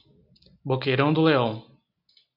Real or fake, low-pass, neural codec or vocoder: real; 5.4 kHz; none